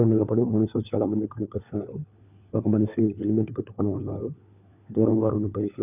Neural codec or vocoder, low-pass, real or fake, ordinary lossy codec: codec, 16 kHz, 4 kbps, FunCodec, trained on LibriTTS, 50 frames a second; 3.6 kHz; fake; none